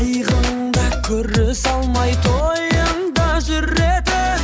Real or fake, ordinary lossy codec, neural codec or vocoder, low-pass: real; none; none; none